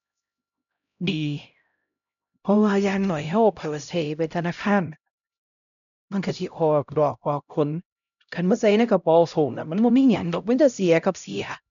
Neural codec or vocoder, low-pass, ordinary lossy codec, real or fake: codec, 16 kHz, 0.5 kbps, X-Codec, HuBERT features, trained on LibriSpeech; 7.2 kHz; none; fake